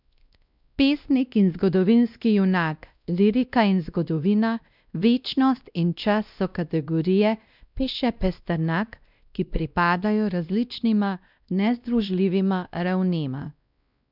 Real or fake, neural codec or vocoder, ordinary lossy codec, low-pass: fake; codec, 16 kHz, 1 kbps, X-Codec, WavLM features, trained on Multilingual LibriSpeech; none; 5.4 kHz